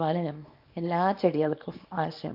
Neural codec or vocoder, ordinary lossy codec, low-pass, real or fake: codec, 24 kHz, 0.9 kbps, WavTokenizer, small release; AAC, 48 kbps; 5.4 kHz; fake